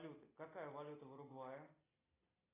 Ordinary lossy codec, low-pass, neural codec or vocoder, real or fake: AAC, 16 kbps; 3.6 kHz; none; real